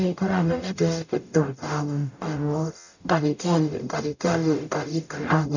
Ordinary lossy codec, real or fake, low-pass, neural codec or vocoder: none; fake; 7.2 kHz; codec, 44.1 kHz, 0.9 kbps, DAC